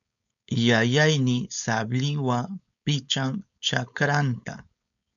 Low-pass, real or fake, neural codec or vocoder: 7.2 kHz; fake; codec, 16 kHz, 4.8 kbps, FACodec